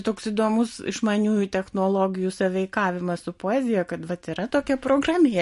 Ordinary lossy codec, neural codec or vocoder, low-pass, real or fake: MP3, 48 kbps; none; 14.4 kHz; real